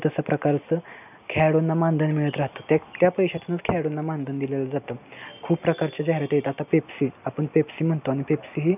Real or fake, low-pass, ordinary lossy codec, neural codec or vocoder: real; 3.6 kHz; none; none